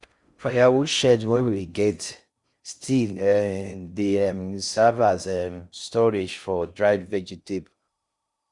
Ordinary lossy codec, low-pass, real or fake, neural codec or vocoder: Opus, 64 kbps; 10.8 kHz; fake; codec, 16 kHz in and 24 kHz out, 0.6 kbps, FocalCodec, streaming, 4096 codes